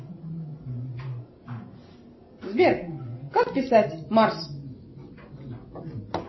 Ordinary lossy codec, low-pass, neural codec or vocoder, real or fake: MP3, 24 kbps; 7.2 kHz; none; real